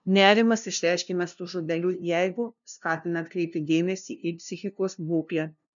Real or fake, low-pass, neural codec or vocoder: fake; 7.2 kHz; codec, 16 kHz, 0.5 kbps, FunCodec, trained on LibriTTS, 25 frames a second